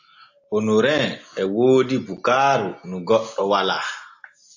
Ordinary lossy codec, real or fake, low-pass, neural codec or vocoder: AAC, 48 kbps; real; 7.2 kHz; none